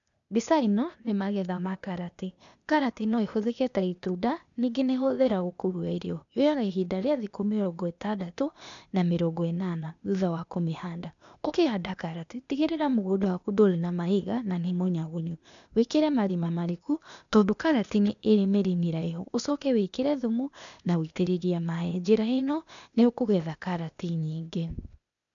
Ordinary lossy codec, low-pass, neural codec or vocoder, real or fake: none; 7.2 kHz; codec, 16 kHz, 0.8 kbps, ZipCodec; fake